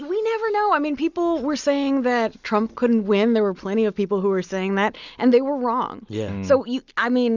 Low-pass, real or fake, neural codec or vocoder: 7.2 kHz; real; none